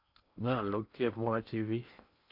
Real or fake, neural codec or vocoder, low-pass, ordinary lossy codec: fake; codec, 16 kHz in and 24 kHz out, 0.8 kbps, FocalCodec, streaming, 65536 codes; 5.4 kHz; none